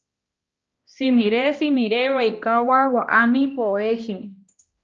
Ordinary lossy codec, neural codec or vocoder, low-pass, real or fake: Opus, 24 kbps; codec, 16 kHz, 1 kbps, X-Codec, HuBERT features, trained on balanced general audio; 7.2 kHz; fake